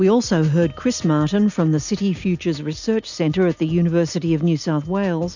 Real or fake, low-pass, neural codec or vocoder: real; 7.2 kHz; none